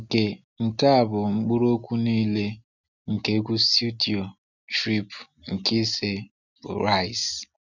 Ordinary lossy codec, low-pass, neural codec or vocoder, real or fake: none; 7.2 kHz; none; real